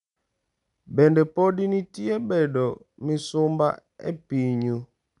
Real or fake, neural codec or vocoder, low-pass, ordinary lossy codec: real; none; 10.8 kHz; none